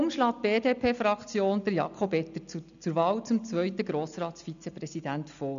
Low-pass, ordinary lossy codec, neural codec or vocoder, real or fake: 7.2 kHz; none; none; real